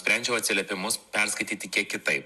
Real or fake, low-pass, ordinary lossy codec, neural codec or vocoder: real; 14.4 kHz; Opus, 64 kbps; none